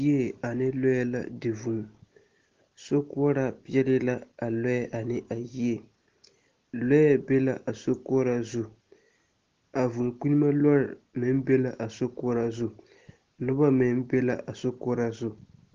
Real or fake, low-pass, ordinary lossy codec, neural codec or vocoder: real; 7.2 kHz; Opus, 16 kbps; none